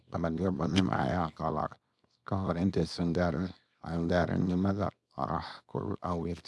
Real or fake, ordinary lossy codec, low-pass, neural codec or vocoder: fake; none; none; codec, 24 kHz, 0.9 kbps, WavTokenizer, small release